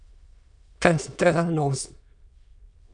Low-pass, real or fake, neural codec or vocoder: 9.9 kHz; fake; autoencoder, 22.05 kHz, a latent of 192 numbers a frame, VITS, trained on many speakers